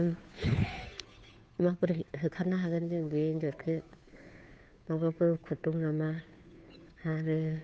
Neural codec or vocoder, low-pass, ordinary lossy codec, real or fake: codec, 16 kHz, 2 kbps, FunCodec, trained on Chinese and English, 25 frames a second; none; none; fake